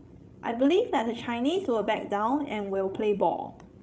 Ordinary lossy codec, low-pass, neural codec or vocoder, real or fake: none; none; codec, 16 kHz, 16 kbps, FreqCodec, larger model; fake